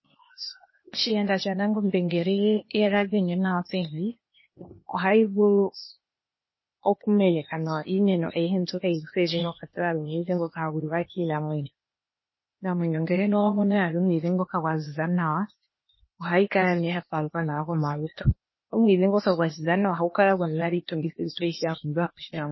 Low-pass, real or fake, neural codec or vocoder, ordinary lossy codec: 7.2 kHz; fake; codec, 16 kHz, 0.8 kbps, ZipCodec; MP3, 24 kbps